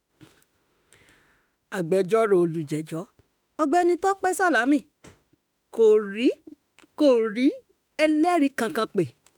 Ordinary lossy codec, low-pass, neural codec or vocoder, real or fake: none; none; autoencoder, 48 kHz, 32 numbers a frame, DAC-VAE, trained on Japanese speech; fake